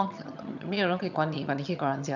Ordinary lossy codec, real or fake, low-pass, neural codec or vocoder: none; fake; 7.2 kHz; vocoder, 22.05 kHz, 80 mel bands, HiFi-GAN